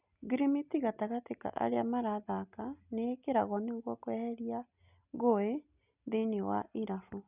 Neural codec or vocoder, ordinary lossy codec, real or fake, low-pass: none; none; real; 3.6 kHz